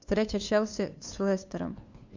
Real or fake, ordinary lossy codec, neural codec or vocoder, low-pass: fake; Opus, 64 kbps; codec, 16 kHz, 2 kbps, FunCodec, trained on LibriTTS, 25 frames a second; 7.2 kHz